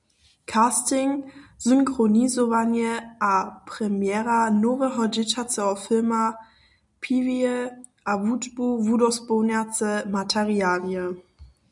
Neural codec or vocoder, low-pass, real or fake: none; 10.8 kHz; real